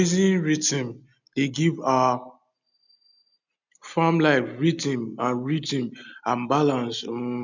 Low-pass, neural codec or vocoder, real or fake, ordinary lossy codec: 7.2 kHz; none; real; none